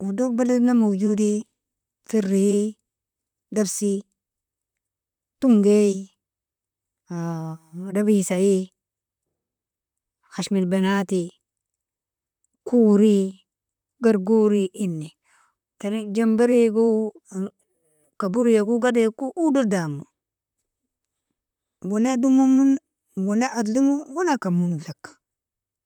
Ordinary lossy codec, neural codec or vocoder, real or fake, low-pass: none; vocoder, 44.1 kHz, 128 mel bands, Pupu-Vocoder; fake; 19.8 kHz